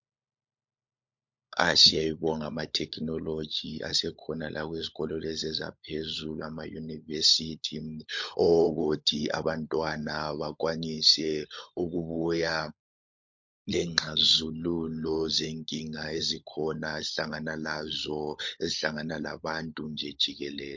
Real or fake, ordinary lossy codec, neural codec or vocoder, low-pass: fake; MP3, 48 kbps; codec, 16 kHz, 16 kbps, FunCodec, trained on LibriTTS, 50 frames a second; 7.2 kHz